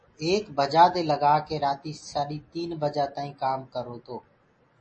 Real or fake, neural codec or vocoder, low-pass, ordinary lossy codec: real; none; 10.8 kHz; MP3, 32 kbps